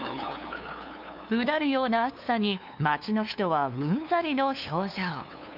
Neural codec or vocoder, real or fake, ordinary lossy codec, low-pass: codec, 16 kHz, 2 kbps, FunCodec, trained on LibriTTS, 25 frames a second; fake; none; 5.4 kHz